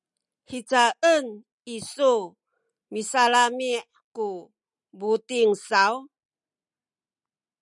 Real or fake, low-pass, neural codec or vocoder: real; 10.8 kHz; none